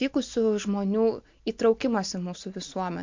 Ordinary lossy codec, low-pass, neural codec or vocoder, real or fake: MP3, 48 kbps; 7.2 kHz; none; real